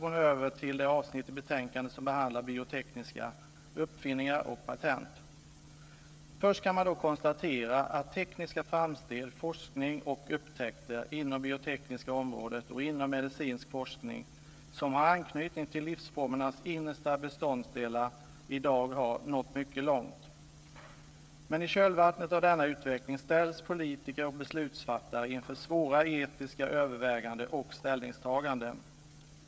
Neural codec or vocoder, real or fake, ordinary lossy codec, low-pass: codec, 16 kHz, 16 kbps, FreqCodec, smaller model; fake; none; none